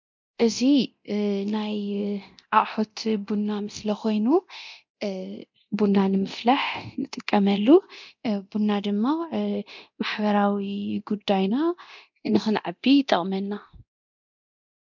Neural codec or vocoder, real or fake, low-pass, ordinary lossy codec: codec, 24 kHz, 0.9 kbps, DualCodec; fake; 7.2 kHz; MP3, 64 kbps